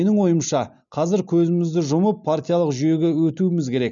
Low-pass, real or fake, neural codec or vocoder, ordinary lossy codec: 7.2 kHz; real; none; MP3, 96 kbps